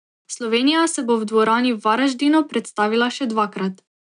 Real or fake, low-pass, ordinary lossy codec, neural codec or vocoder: real; 9.9 kHz; none; none